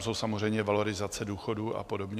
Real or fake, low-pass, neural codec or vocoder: real; 14.4 kHz; none